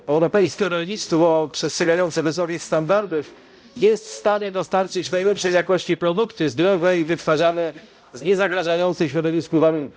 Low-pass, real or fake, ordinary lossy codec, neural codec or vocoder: none; fake; none; codec, 16 kHz, 0.5 kbps, X-Codec, HuBERT features, trained on balanced general audio